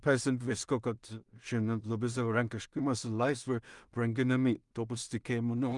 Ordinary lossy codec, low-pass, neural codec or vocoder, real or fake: Opus, 32 kbps; 10.8 kHz; codec, 16 kHz in and 24 kHz out, 0.4 kbps, LongCat-Audio-Codec, two codebook decoder; fake